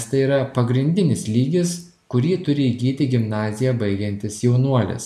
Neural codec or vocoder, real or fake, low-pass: none; real; 14.4 kHz